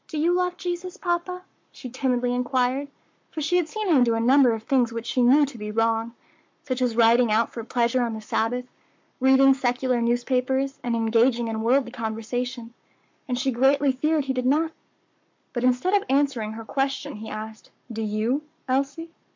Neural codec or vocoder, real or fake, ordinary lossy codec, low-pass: codec, 44.1 kHz, 7.8 kbps, Pupu-Codec; fake; MP3, 64 kbps; 7.2 kHz